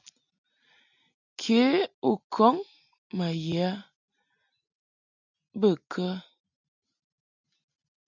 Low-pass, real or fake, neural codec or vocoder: 7.2 kHz; real; none